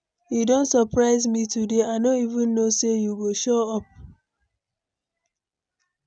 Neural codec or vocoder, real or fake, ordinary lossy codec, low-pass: none; real; none; 9.9 kHz